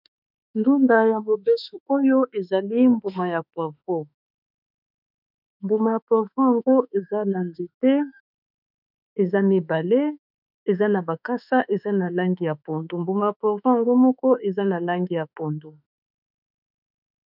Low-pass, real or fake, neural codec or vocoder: 5.4 kHz; fake; autoencoder, 48 kHz, 32 numbers a frame, DAC-VAE, trained on Japanese speech